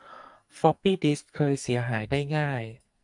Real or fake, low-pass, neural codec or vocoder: fake; 10.8 kHz; codec, 44.1 kHz, 2.6 kbps, SNAC